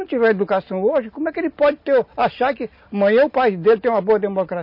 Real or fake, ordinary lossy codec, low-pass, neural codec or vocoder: real; MP3, 32 kbps; 5.4 kHz; none